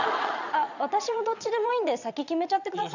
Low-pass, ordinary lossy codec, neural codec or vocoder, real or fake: 7.2 kHz; none; vocoder, 22.05 kHz, 80 mel bands, Vocos; fake